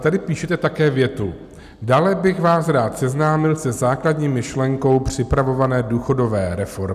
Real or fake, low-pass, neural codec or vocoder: real; 14.4 kHz; none